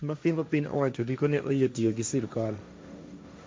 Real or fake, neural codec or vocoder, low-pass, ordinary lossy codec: fake; codec, 16 kHz, 1.1 kbps, Voila-Tokenizer; none; none